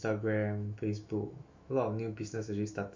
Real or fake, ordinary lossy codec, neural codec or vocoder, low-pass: fake; MP3, 48 kbps; autoencoder, 48 kHz, 128 numbers a frame, DAC-VAE, trained on Japanese speech; 7.2 kHz